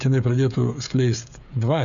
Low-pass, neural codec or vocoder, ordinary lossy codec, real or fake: 7.2 kHz; codec, 16 kHz, 16 kbps, FreqCodec, smaller model; MP3, 96 kbps; fake